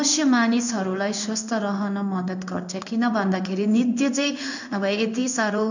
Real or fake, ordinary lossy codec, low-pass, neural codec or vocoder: fake; none; 7.2 kHz; codec, 16 kHz in and 24 kHz out, 1 kbps, XY-Tokenizer